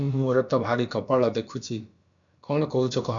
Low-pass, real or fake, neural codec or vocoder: 7.2 kHz; fake; codec, 16 kHz, about 1 kbps, DyCAST, with the encoder's durations